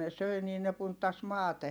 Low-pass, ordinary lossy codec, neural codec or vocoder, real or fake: none; none; none; real